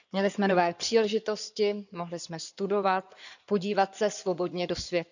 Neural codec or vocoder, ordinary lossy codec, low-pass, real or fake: codec, 16 kHz in and 24 kHz out, 2.2 kbps, FireRedTTS-2 codec; none; 7.2 kHz; fake